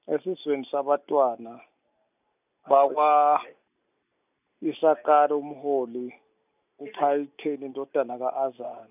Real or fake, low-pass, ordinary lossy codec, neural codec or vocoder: real; 3.6 kHz; none; none